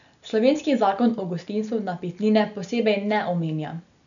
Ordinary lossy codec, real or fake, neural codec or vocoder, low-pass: none; real; none; 7.2 kHz